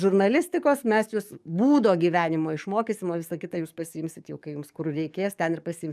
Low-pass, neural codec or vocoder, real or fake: 14.4 kHz; codec, 44.1 kHz, 7.8 kbps, DAC; fake